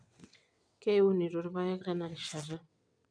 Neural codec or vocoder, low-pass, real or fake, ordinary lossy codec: vocoder, 22.05 kHz, 80 mel bands, WaveNeXt; 9.9 kHz; fake; none